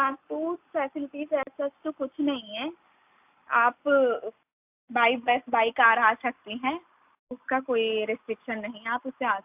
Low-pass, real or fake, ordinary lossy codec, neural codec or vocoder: 3.6 kHz; real; AAC, 32 kbps; none